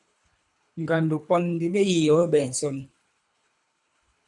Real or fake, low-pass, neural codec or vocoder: fake; 10.8 kHz; codec, 24 kHz, 3 kbps, HILCodec